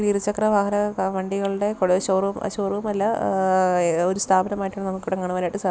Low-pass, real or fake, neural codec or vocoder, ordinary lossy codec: none; real; none; none